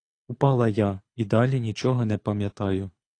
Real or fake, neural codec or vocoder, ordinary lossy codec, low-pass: fake; codec, 24 kHz, 6 kbps, HILCodec; AAC, 48 kbps; 9.9 kHz